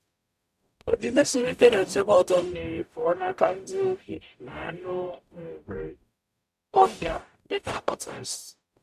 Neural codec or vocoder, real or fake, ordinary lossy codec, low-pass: codec, 44.1 kHz, 0.9 kbps, DAC; fake; none; 14.4 kHz